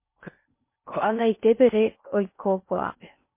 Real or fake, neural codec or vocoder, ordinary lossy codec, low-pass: fake; codec, 16 kHz in and 24 kHz out, 0.6 kbps, FocalCodec, streaming, 4096 codes; MP3, 24 kbps; 3.6 kHz